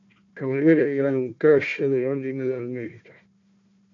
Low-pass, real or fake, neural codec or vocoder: 7.2 kHz; fake; codec, 16 kHz, 1 kbps, FunCodec, trained on Chinese and English, 50 frames a second